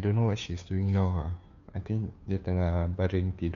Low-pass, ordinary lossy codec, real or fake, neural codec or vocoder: 7.2 kHz; MP3, 96 kbps; fake; codec, 16 kHz, 2 kbps, FunCodec, trained on Chinese and English, 25 frames a second